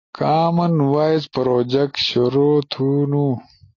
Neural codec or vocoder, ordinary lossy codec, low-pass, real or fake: none; AAC, 32 kbps; 7.2 kHz; real